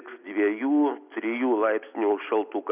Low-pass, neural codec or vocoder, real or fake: 3.6 kHz; none; real